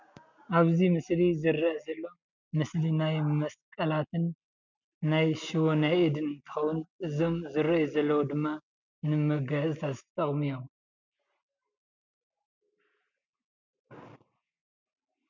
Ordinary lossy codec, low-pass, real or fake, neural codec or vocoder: MP3, 64 kbps; 7.2 kHz; real; none